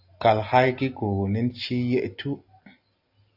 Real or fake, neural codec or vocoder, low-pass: real; none; 5.4 kHz